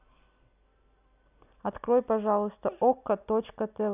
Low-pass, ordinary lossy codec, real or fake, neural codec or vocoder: 3.6 kHz; none; real; none